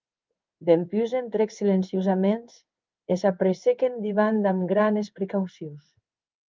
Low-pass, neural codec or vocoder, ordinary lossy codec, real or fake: 7.2 kHz; codec, 16 kHz in and 24 kHz out, 1 kbps, XY-Tokenizer; Opus, 24 kbps; fake